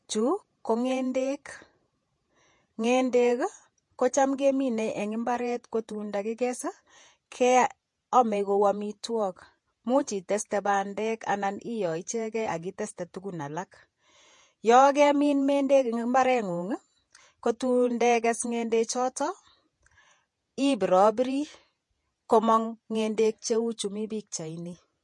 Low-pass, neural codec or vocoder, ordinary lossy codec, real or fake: 10.8 kHz; vocoder, 48 kHz, 128 mel bands, Vocos; MP3, 48 kbps; fake